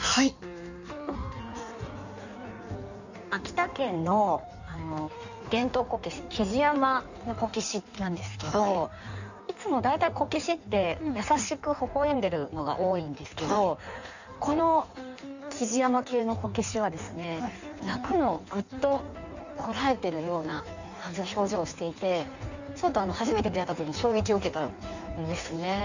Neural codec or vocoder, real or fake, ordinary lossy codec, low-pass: codec, 16 kHz in and 24 kHz out, 1.1 kbps, FireRedTTS-2 codec; fake; none; 7.2 kHz